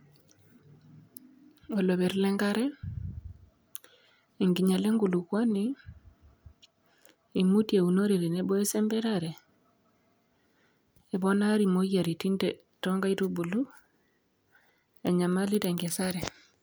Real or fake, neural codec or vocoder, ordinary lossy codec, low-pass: real; none; none; none